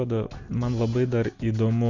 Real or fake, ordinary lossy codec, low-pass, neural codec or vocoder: real; Opus, 64 kbps; 7.2 kHz; none